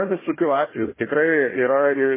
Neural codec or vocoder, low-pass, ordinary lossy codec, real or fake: codec, 16 kHz, 1 kbps, FunCodec, trained on Chinese and English, 50 frames a second; 3.6 kHz; MP3, 16 kbps; fake